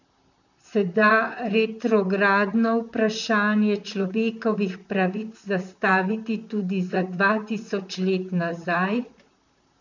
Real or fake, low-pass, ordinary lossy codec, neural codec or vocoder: fake; 7.2 kHz; none; vocoder, 44.1 kHz, 128 mel bands, Pupu-Vocoder